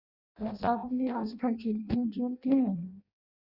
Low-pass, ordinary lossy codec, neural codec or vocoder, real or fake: 5.4 kHz; Opus, 64 kbps; codec, 16 kHz in and 24 kHz out, 0.6 kbps, FireRedTTS-2 codec; fake